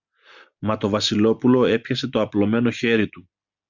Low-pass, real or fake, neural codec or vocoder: 7.2 kHz; real; none